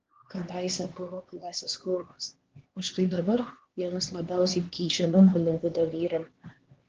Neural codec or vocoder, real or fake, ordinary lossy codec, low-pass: codec, 16 kHz, 2 kbps, X-Codec, HuBERT features, trained on LibriSpeech; fake; Opus, 16 kbps; 7.2 kHz